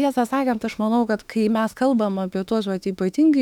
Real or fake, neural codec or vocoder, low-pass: fake; autoencoder, 48 kHz, 32 numbers a frame, DAC-VAE, trained on Japanese speech; 19.8 kHz